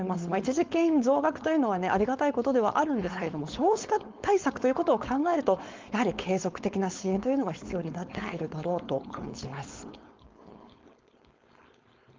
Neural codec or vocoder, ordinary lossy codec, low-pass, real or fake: codec, 16 kHz, 4.8 kbps, FACodec; Opus, 32 kbps; 7.2 kHz; fake